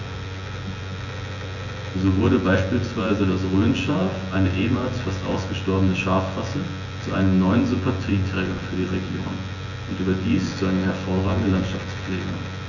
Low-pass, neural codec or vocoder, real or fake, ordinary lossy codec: 7.2 kHz; vocoder, 24 kHz, 100 mel bands, Vocos; fake; none